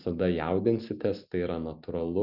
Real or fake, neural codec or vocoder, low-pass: real; none; 5.4 kHz